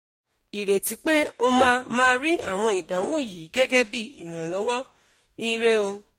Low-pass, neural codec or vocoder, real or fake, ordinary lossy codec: 19.8 kHz; codec, 44.1 kHz, 2.6 kbps, DAC; fake; MP3, 64 kbps